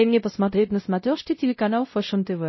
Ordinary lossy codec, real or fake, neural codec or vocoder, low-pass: MP3, 24 kbps; fake; codec, 16 kHz, 0.5 kbps, X-Codec, WavLM features, trained on Multilingual LibriSpeech; 7.2 kHz